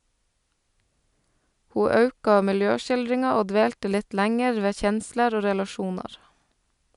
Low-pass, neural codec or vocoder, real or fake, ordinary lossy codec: 10.8 kHz; none; real; none